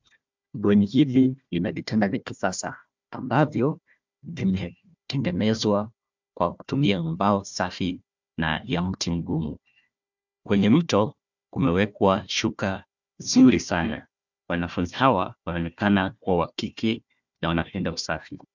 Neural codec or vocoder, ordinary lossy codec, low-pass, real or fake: codec, 16 kHz, 1 kbps, FunCodec, trained on Chinese and English, 50 frames a second; MP3, 64 kbps; 7.2 kHz; fake